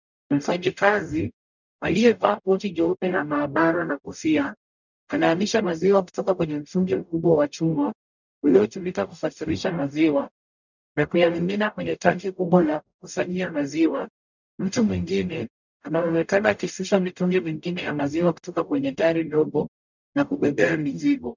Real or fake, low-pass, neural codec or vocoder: fake; 7.2 kHz; codec, 44.1 kHz, 0.9 kbps, DAC